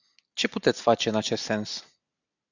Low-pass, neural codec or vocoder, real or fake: 7.2 kHz; none; real